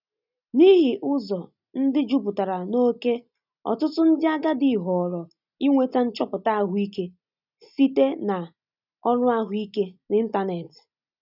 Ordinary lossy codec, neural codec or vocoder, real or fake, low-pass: none; none; real; 5.4 kHz